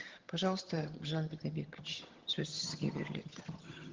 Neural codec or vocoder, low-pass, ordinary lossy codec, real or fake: vocoder, 22.05 kHz, 80 mel bands, HiFi-GAN; 7.2 kHz; Opus, 16 kbps; fake